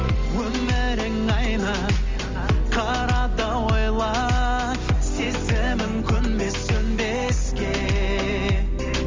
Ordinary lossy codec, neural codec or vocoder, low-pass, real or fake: Opus, 32 kbps; none; 7.2 kHz; real